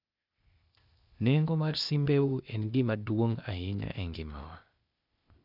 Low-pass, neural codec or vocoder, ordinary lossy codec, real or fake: 5.4 kHz; codec, 16 kHz, 0.8 kbps, ZipCodec; none; fake